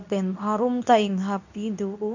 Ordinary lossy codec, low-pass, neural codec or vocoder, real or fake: none; 7.2 kHz; codec, 24 kHz, 0.9 kbps, WavTokenizer, medium speech release version 2; fake